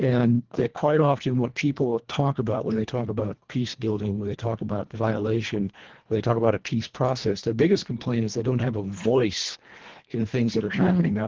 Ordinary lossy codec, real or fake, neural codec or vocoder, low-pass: Opus, 16 kbps; fake; codec, 24 kHz, 1.5 kbps, HILCodec; 7.2 kHz